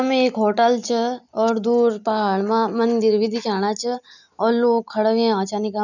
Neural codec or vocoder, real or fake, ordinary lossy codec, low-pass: none; real; none; 7.2 kHz